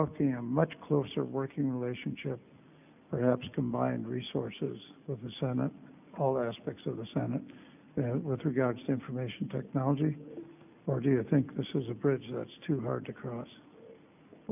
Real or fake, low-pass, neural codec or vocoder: real; 3.6 kHz; none